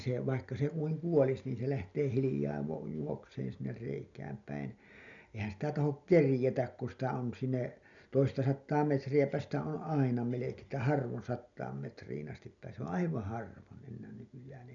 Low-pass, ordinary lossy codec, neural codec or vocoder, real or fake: 7.2 kHz; none; none; real